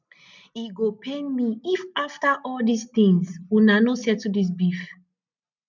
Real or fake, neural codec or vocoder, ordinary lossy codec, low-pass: real; none; none; 7.2 kHz